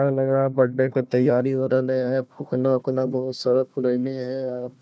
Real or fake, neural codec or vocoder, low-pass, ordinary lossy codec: fake; codec, 16 kHz, 1 kbps, FunCodec, trained on Chinese and English, 50 frames a second; none; none